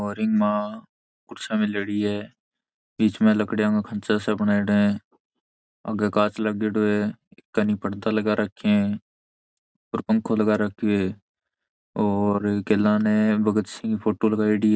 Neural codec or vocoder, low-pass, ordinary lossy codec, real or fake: none; none; none; real